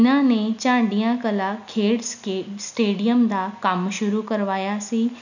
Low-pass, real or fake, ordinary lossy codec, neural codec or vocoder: 7.2 kHz; real; none; none